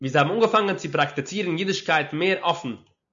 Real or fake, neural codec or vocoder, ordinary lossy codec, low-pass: real; none; MP3, 96 kbps; 7.2 kHz